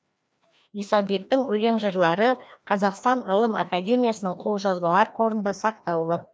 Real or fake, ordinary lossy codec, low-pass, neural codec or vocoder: fake; none; none; codec, 16 kHz, 1 kbps, FreqCodec, larger model